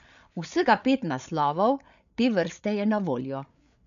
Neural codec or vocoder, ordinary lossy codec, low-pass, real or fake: codec, 16 kHz, 8 kbps, FreqCodec, larger model; none; 7.2 kHz; fake